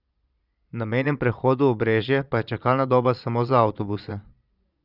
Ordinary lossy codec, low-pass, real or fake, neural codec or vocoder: none; 5.4 kHz; fake; vocoder, 22.05 kHz, 80 mel bands, Vocos